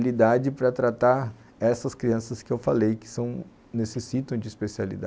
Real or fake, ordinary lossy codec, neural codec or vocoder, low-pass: real; none; none; none